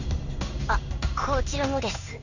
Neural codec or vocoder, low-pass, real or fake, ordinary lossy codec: codec, 16 kHz in and 24 kHz out, 1 kbps, XY-Tokenizer; 7.2 kHz; fake; none